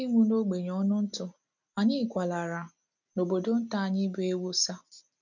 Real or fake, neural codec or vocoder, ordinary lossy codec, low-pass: real; none; none; 7.2 kHz